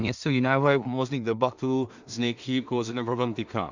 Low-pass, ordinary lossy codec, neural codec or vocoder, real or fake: 7.2 kHz; Opus, 64 kbps; codec, 16 kHz in and 24 kHz out, 0.4 kbps, LongCat-Audio-Codec, two codebook decoder; fake